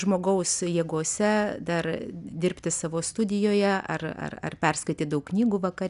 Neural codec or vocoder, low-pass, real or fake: none; 10.8 kHz; real